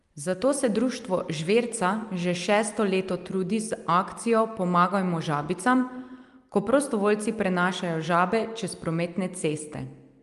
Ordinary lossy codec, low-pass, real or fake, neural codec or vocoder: Opus, 32 kbps; 10.8 kHz; real; none